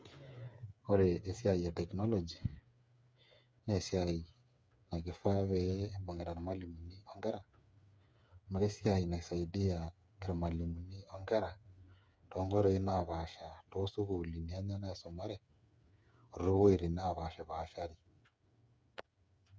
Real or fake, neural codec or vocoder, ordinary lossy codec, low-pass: fake; codec, 16 kHz, 8 kbps, FreqCodec, smaller model; none; none